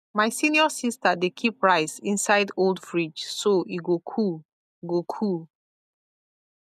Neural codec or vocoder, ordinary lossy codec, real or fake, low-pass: none; none; real; 14.4 kHz